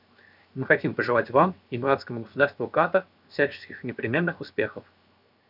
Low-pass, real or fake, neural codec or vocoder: 5.4 kHz; fake; codec, 16 kHz, 0.7 kbps, FocalCodec